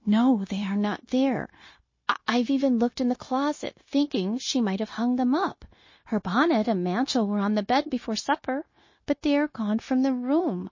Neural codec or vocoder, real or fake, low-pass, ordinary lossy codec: codec, 16 kHz, 0.9 kbps, LongCat-Audio-Codec; fake; 7.2 kHz; MP3, 32 kbps